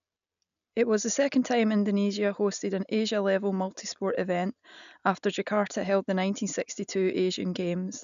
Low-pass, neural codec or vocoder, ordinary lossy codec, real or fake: 7.2 kHz; none; none; real